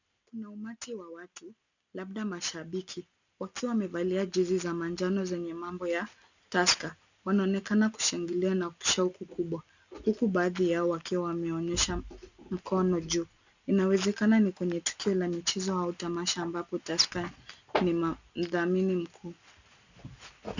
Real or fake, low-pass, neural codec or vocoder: real; 7.2 kHz; none